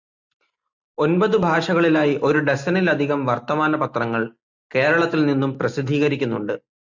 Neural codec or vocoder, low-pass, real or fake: none; 7.2 kHz; real